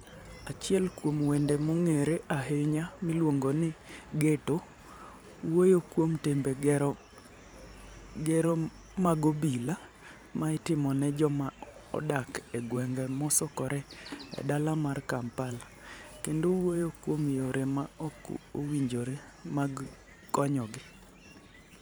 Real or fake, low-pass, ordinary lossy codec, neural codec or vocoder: fake; none; none; vocoder, 44.1 kHz, 128 mel bands every 512 samples, BigVGAN v2